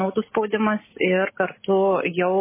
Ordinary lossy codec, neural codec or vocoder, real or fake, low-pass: MP3, 16 kbps; none; real; 3.6 kHz